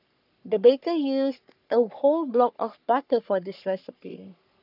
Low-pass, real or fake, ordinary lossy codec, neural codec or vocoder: 5.4 kHz; fake; none; codec, 44.1 kHz, 3.4 kbps, Pupu-Codec